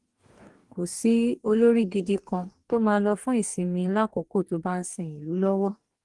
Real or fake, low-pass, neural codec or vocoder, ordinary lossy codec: fake; 10.8 kHz; codec, 44.1 kHz, 2.6 kbps, DAC; Opus, 24 kbps